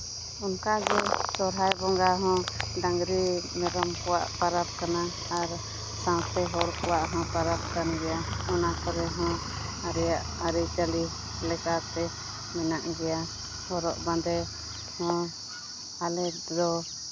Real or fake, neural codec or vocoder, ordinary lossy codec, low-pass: real; none; none; none